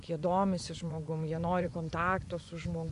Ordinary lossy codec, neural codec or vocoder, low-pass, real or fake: AAC, 64 kbps; vocoder, 24 kHz, 100 mel bands, Vocos; 10.8 kHz; fake